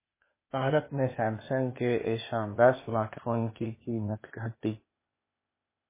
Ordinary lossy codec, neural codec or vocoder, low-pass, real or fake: MP3, 16 kbps; codec, 16 kHz, 0.8 kbps, ZipCodec; 3.6 kHz; fake